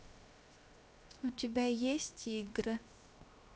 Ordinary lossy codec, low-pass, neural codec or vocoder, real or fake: none; none; codec, 16 kHz, 0.7 kbps, FocalCodec; fake